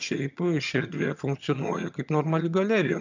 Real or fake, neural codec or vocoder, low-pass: fake; vocoder, 22.05 kHz, 80 mel bands, HiFi-GAN; 7.2 kHz